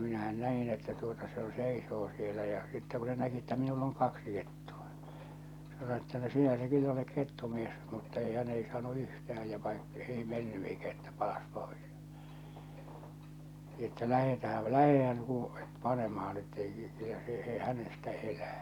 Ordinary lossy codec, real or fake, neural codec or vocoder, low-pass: none; real; none; 19.8 kHz